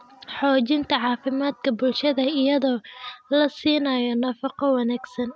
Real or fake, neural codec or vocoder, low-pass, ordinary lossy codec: real; none; none; none